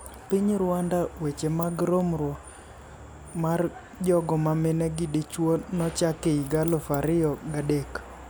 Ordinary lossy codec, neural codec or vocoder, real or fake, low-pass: none; none; real; none